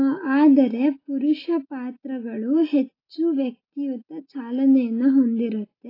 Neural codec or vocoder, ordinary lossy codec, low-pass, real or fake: none; AAC, 24 kbps; 5.4 kHz; real